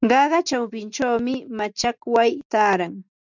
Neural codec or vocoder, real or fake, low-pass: none; real; 7.2 kHz